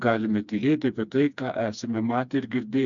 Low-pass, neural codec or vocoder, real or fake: 7.2 kHz; codec, 16 kHz, 2 kbps, FreqCodec, smaller model; fake